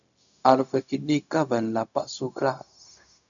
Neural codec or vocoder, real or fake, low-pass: codec, 16 kHz, 0.4 kbps, LongCat-Audio-Codec; fake; 7.2 kHz